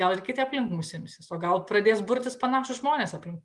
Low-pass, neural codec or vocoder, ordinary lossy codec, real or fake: 10.8 kHz; none; Opus, 32 kbps; real